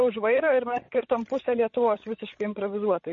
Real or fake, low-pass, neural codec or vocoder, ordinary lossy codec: fake; 7.2 kHz; codec, 16 kHz, 16 kbps, FreqCodec, larger model; MP3, 32 kbps